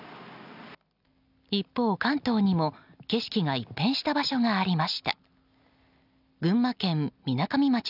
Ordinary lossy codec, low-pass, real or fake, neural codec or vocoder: none; 5.4 kHz; real; none